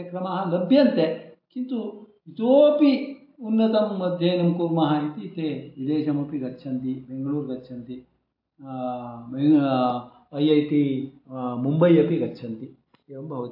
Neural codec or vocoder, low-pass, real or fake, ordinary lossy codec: none; 5.4 kHz; real; AAC, 48 kbps